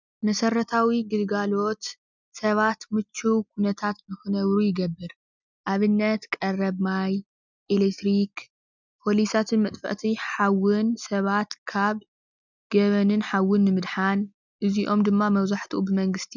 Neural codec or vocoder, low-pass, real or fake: none; 7.2 kHz; real